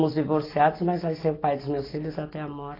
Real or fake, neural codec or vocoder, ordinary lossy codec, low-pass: real; none; AAC, 24 kbps; 5.4 kHz